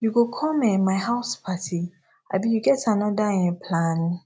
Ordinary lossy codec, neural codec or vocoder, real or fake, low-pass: none; none; real; none